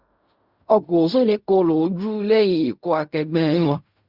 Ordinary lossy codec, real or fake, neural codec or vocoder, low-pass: Opus, 64 kbps; fake; codec, 16 kHz in and 24 kHz out, 0.4 kbps, LongCat-Audio-Codec, fine tuned four codebook decoder; 5.4 kHz